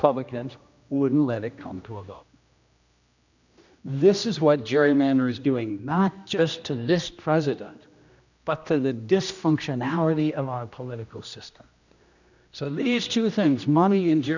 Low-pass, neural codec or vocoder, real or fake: 7.2 kHz; codec, 16 kHz, 1 kbps, X-Codec, HuBERT features, trained on general audio; fake